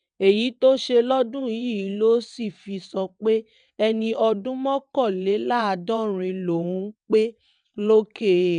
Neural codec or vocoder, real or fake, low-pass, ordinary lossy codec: vocoder, 22.05 kHz, 80 mel bands, WaveNeXt; fake; 9.9 kHz; none